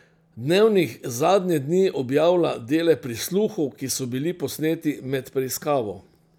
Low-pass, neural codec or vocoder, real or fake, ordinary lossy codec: 19.8 kHz; none; real; none